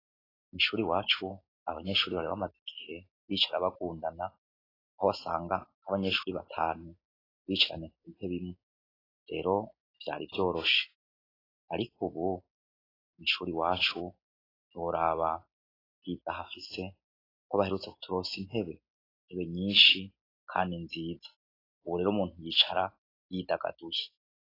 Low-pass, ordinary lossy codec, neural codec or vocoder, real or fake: 5.4 kHz; AAC, 24 kbps; none; real